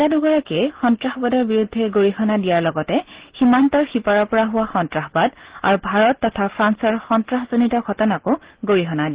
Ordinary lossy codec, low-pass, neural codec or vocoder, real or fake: Opus, 16 kbps; 3.6 kHz; none; real